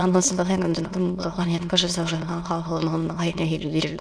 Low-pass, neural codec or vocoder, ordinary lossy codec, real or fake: none; autoencoder, 22.05 kHz, a latent of 192 numbers a frame, VITS, trained on many speakers; none; fake